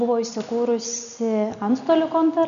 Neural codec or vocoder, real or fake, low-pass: none; real; 7.2 kHz